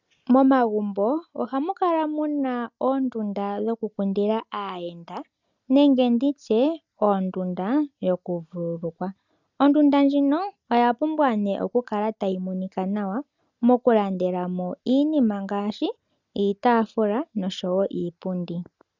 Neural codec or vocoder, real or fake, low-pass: none; real; 7.2 kHz